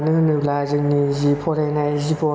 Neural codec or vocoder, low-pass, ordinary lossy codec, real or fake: none; none; none; real